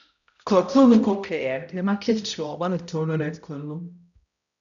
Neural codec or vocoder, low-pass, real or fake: codec, 16 kHz, 0.5 kbps, X-Codec, HuBERT features, trained on balanced general audio; 7.2 kHz; fake